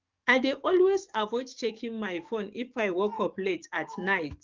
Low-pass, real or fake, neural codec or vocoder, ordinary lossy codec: 7.2 kHz; fake; codec, 44.1 kHz, 7.8 kbps, DAC; Opus, 24 kbps